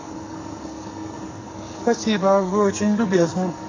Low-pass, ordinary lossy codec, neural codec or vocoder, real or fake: 7.2 kHz; AAC, 32 kbps; codec, 44.1 kHz, 2.6 kbps, SNAC; fake